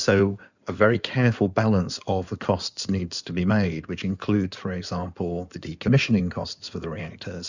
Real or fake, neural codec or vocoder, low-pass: fake; codec, 16 kHz in and 24 kHz out, 2.2 kbps, FireRedTTS-2 codec; 7.2 kHz